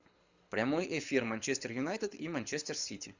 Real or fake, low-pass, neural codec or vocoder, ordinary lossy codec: fake; 7.2 kHz; codec, 24 kHz, 6 kbps, HILCodec; Opus, 64 kbps